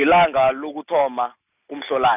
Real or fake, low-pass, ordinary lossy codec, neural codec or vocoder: real; 3.6 kHz; none; none